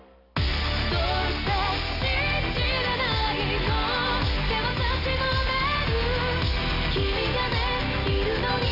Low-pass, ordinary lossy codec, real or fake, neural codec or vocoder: 5.4 kHz; none; real; none